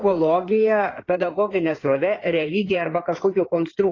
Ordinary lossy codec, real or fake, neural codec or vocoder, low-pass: AAC, 32 kbps; fake; codec, 16 kHz in and 24 kHz out, 2.2 kbps, FireRedTTS-2 codec; 7.2 kHz